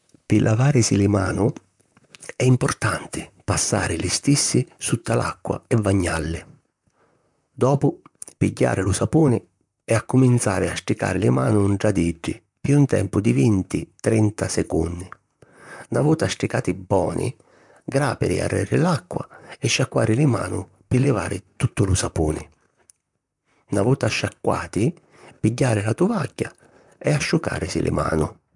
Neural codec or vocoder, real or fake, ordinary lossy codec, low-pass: vocoder, 44.1 kHz, 128 mel bands, Pupu-Vocoder; fake; MP3, 96 kbps; 10.8 kHz